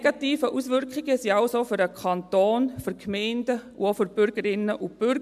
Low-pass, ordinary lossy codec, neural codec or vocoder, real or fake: 14.4 kHz; MP3, 96 kbps; none; real